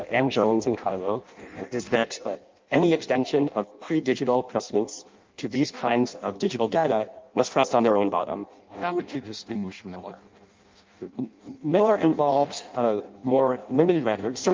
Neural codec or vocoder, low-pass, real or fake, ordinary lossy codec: codec, 16 kHz in and 24 kHz out, 0.6 kbps, FireRedTTS-2 codec; 7.2 kHz; fake; Opus, 24 kbps